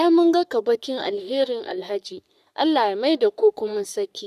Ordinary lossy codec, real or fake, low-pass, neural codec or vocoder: none; fake; 14.4 kHz; codec, 44.1 kHz, 3.4 kbps, Pupu-Codec